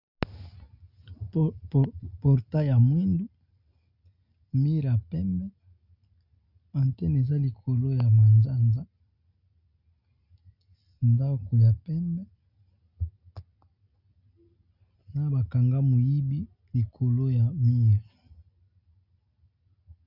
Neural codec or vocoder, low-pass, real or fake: none; 5.4 kHz; real